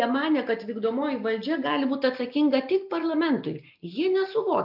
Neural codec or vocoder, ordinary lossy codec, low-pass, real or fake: none; AAC, 48 kbps; 5.4 kHz; real